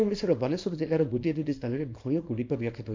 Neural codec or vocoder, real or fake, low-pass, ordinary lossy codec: codec, 24 kHz, 0.9 kbps, WavTokenizer, small release; fake; 7.2 kHz; MP3, 48 kbps